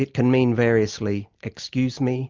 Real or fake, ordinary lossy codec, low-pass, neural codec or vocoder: real; Opus, 24 kbps; 7.2 kHz; none